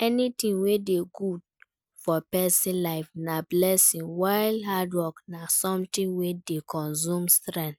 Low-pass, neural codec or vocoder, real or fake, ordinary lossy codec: none; none; real; none